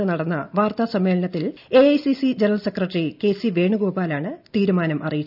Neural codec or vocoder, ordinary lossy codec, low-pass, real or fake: none; none; 5.4 kHz; real